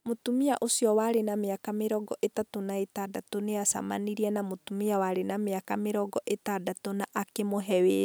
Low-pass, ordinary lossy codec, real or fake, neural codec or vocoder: none; none; real; none